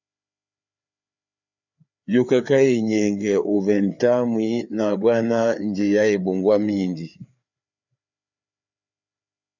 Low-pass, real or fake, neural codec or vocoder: 7.2 kHz; fake; codec, 16 kHz, 4 kbps, FreqCodec, larger model